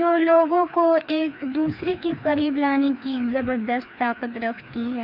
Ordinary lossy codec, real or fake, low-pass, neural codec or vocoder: none; fake; 5.4 kHz; codec, 16 kHz, 2 kbps, FreqCodec, larger model